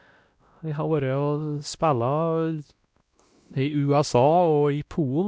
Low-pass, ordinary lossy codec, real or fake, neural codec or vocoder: none; none; fake; codec, 16 kHz, 1 kbps, X-Codec, WavLM features, trained on Multilingual LibriSpeech